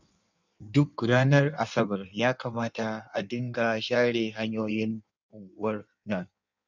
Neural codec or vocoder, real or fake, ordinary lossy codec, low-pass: codec, 16 kHz in and 24 kHz out, 1.1 kbps, FireRedTTS-2 codec; fake; none; 7.2 kHz